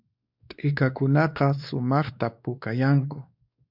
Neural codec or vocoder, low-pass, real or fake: codec, 16 kHz, 2 kbps, X-Codec, WavLM features, trained on Multilingual LibriSpeech; 5.4 kHz; fake